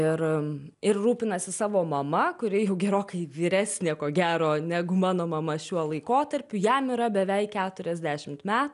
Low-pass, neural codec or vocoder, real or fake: 10.8 kHz; none; real